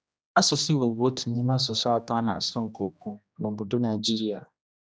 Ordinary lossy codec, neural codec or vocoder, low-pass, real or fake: none; codec, 16 kHz, 1 kbps, X-Codec, HuBERT features, trained on general audio; none; fake